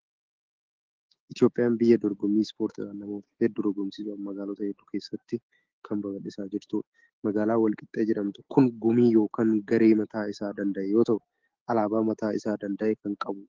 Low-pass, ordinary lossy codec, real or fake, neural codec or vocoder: 7.2 kHz; Opus, 16 kbps; fake; autoencoder, 48 kHz, 128 numbers a frame, DAC-VAE, trained on Japanese speech